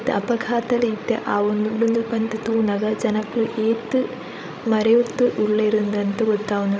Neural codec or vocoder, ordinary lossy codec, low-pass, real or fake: codec, 16 kHz, 16 kbps, FreqCodec, larger model; none; none; fake